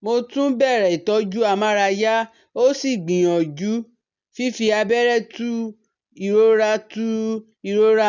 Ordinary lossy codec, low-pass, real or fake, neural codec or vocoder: none; 7.2 kHz; real; none